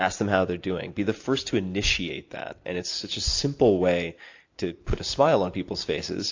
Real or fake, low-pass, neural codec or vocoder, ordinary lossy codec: real; 7.2 kHz; none; AAC, 48 kbps